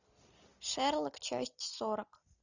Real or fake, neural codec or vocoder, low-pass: real; none; 7.2 kHz